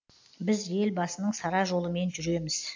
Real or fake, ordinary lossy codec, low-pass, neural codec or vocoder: real; AAC, 48 kbps; 7.2 kHz; none